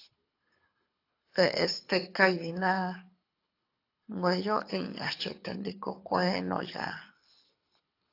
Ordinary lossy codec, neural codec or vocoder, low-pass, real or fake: AAC, 32 kbps; codec, 24 kHz, 6 kbps, HILCodec; 5.4 kHz; fake